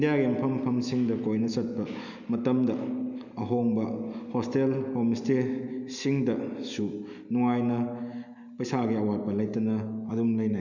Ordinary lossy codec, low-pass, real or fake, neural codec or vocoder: none; 7.2 kHz; real; none